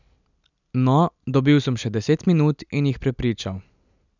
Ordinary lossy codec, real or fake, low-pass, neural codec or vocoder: none; real; 7.2 kHz; none